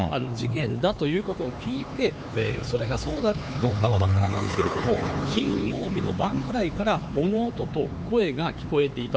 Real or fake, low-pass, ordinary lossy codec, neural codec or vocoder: fake; none; none; codec, 16 kHz, 4 kbps, X-Codec, HuBERT features, trained on LibriSpeech